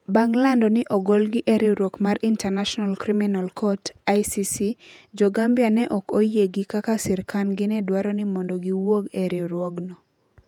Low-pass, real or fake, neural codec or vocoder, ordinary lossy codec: 19.8 kHz; fake; vocoder, 48 kHz, 128 mel bands, Vocos; none